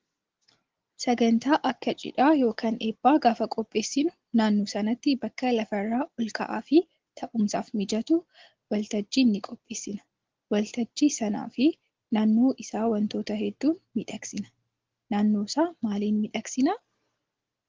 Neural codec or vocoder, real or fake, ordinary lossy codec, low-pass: none; real; Opus, 16 kbps; 7.2 kHz